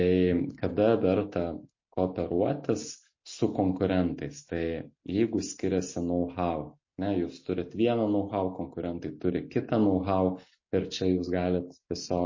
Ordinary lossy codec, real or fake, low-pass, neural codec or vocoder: MP3, 32 kbps; real; 7.2 kHz; none